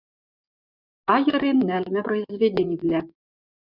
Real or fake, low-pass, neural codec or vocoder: fake; 5.4 kHz; vocoder, 24 kHz, 100 mel bands, Vocos